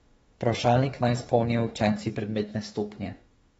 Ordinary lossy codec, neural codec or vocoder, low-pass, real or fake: AAC, 24 kbps; autoencoder, 48 kHz, 32 numbers a frame, DAC-VAE, trained on Japanese speech; 19.8 kHz; fake